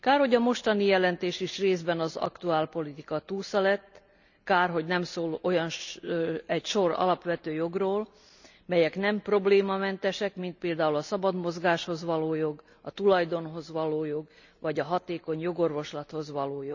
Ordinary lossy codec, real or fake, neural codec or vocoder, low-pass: none; real; none; 7.2 kHz